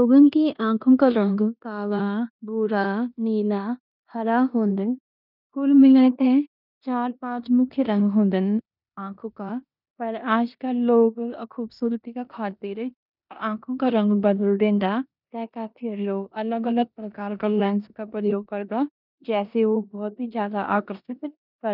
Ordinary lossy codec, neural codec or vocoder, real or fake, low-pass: none; codec, 16 kHz in and 24 kHz out, 0.9 kbps, LongCat-Audio-Codec, four codebook decoder; fake; 5.4 kHz